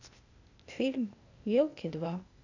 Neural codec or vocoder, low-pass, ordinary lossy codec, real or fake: codec, 16 kHz, 0.8 kbps, ZipCodec; 7.2 kHz; none; fake